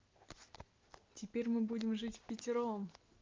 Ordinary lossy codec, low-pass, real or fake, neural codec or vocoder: Opus, 32 kbps; 7.2 kHz; real; none